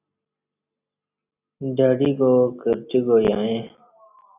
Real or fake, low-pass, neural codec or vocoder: real; 3.6 kHz; none